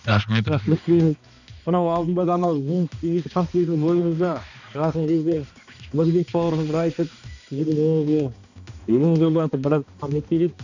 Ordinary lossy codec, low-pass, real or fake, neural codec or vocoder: none; 7.2 kHz; fake; codec, 16 kHz, 2 kbps, X-Codec, HuBERT features, trained on balanced general audio